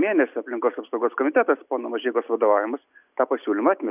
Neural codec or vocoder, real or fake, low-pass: none; real; 3.6 kHz